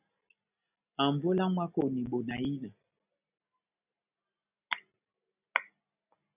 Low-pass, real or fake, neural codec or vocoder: 3.6 kHz; real; none